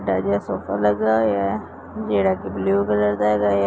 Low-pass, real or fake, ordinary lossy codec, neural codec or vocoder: none; real; none; none